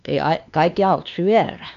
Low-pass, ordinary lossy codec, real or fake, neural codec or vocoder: 7.2 kHz; none; fake; codec, 16 kHz, 2 kbps, X-Codec, WavLM features, trained on Multilingual LibriSpeech